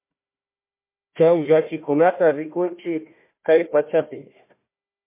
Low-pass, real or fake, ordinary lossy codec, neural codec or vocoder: 3.6 kHz; fake; MP3, 24 kbps; codec, 16 kHz, 1 kbps, FunCodec, trained on Chinese and English, 50 frames a second